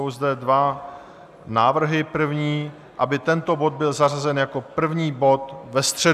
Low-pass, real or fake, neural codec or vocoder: 14.4 kHz; real; none